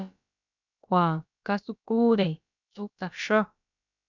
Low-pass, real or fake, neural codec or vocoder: 7.2 kHz; fake; codec, 16 kHz, about 1 kbps, DyCAST, with the encoder's durations